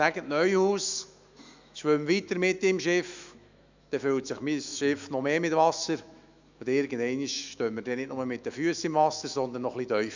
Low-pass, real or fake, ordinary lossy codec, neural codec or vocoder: 7.2 kHz; fake; Opus, 64 kbps; autoencoder, 48 kHz, 128 numbers a frame, DAC-VAE, trained on Japanese speech